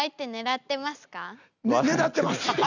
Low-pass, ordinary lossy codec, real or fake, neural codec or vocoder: 7.2 kHz; none; real; none